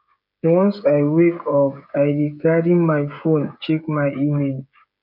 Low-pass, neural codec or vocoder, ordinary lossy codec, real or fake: 5.4 kHz; codec, 16 kHz, 16 kbps, FreqCodec, smaller model; none; fake